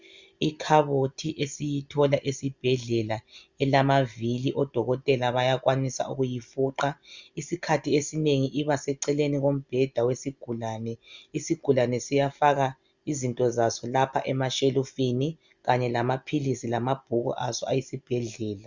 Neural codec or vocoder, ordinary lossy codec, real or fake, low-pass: none; Opus, 64 kbps; real; 7.2 kHz